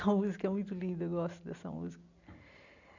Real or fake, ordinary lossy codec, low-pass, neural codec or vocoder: real; none; 7.2 kHz; none